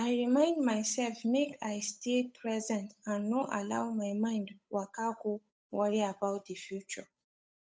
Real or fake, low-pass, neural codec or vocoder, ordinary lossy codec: fake; none; codec, 16 kHz, 8 kbps, FunCodec, trained on Chinese and English, 25 frames a second; none